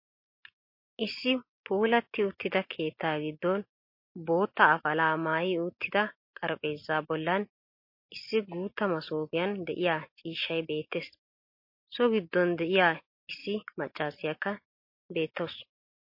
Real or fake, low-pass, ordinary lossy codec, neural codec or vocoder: real; 5.4 kHz; MP3, 32 kbps; none